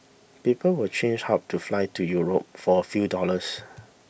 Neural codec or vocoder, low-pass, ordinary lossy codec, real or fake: none; none; none; real